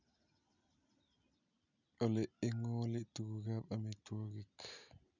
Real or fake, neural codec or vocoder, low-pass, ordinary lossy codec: real; none; 7.2 kHz; none